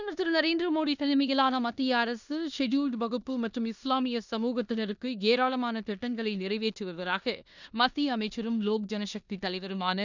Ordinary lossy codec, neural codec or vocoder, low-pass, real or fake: none; codec, 16 kHz in and 24 kHz out, 0.9 kbps, LongCat-Audio-Codec, four codebook decoder; 7.2 kHz; fake